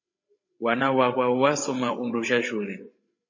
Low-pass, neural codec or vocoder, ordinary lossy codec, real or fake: 7.2 kHz; codec, 16 kHz, 16 kbps, FreqCodec, larger model; MP3, 32 kbps; fake